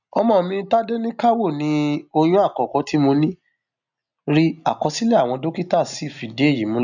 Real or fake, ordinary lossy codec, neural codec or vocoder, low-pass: real; none; none; 7.2 kHz